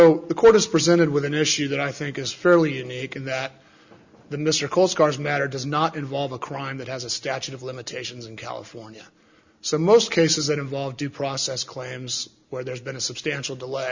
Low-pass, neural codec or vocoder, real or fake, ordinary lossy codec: 7.2 kHz; none; real; Opus, 64 kbps